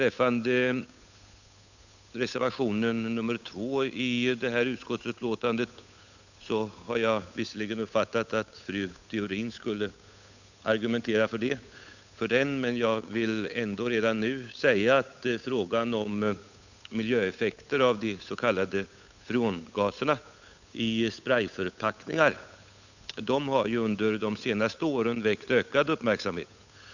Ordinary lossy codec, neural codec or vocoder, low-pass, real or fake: none; codec, 16 kHz, 8 kbps, FunCodec, trained on Chinese and English, 25 frames a second; 7.2 kHz; fake